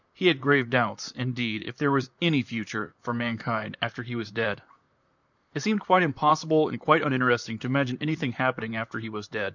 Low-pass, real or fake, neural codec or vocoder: 7.2 kHz; fake; vocoder, 44.1 kHz, 128 mel bands, Pupu-Vocoder